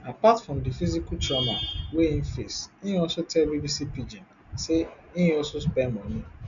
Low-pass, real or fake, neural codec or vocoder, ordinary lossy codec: 7.2 kHz; real; none; none